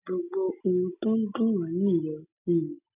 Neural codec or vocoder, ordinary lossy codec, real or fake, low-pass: none; none; real; 3.6 kHz